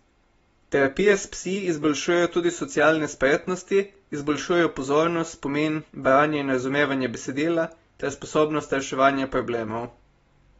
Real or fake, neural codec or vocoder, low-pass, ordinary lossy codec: real; none; 19.8 kHz; AAC, 24 kbps